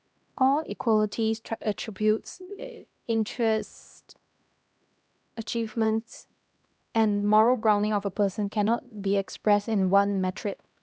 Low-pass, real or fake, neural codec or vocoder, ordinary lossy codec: none; fake; codec, 16 kHz, 1 kbps, X-Codec, HuBERT features, trained on LibriSpeech; none